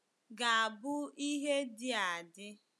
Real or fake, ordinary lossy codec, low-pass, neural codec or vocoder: real; none; none; none